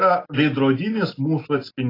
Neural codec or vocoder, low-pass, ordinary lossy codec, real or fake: none; 5.4 kHz; AAC, 24 kbps; real